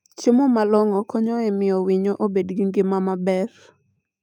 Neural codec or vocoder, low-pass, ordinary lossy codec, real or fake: autoencoder, 48 kHz, 128 numbers a frame, DAC-VAE, trained on Japanese speech; 19.8 kHz; none; fake